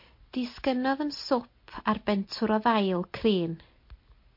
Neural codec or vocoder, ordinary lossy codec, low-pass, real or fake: none; MP3, 32 kbps; 5.4 kHz; real